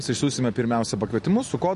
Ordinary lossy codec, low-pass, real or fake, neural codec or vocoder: MP3, 48 kbps; 14.4 kHz; real; none